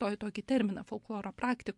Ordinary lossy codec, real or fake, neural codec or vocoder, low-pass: MP3, 64 kbps; real; none; 10.8 kHz